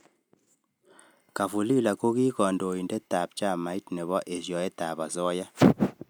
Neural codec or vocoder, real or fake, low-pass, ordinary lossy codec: none; real; none; none